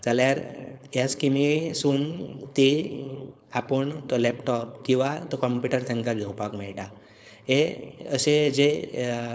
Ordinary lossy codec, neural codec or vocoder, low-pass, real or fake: none; codec, 16 kHz, 4.8 kbps, FACodec; none; fake